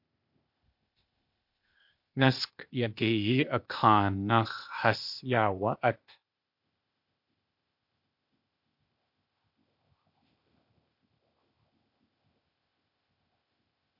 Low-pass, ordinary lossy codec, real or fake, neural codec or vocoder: 5.4 kHz; MP3, 48 kbps; fake; codec, 16 kHz, 0.8 kbps, ZipCodec